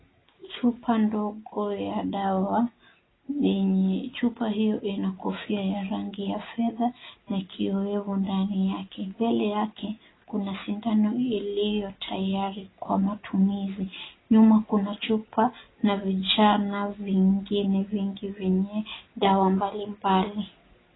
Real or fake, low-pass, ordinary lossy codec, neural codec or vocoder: real; 7.2 kHz; AAC, 16 kbps; none